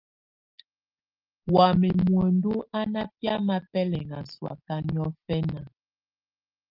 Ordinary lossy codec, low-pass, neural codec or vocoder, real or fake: Opus, 32 kbps; 5.4 kHz; none; real